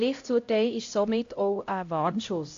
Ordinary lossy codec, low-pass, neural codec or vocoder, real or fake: AAC, 48 kbps; 7.2 kHz; codec, 16 kHz, 0.5 kbps, X-Codec, HuBERT features, trained on LibriSpeech; fake